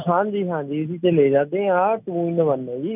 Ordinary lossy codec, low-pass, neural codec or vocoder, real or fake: none; 3.6 kHz; none; real